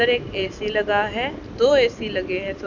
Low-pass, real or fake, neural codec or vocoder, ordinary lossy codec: 7.2 kHz; real; none; none